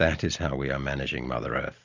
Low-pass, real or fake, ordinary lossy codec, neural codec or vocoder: 7.2 kHz; real; AAC, 48 kbps; none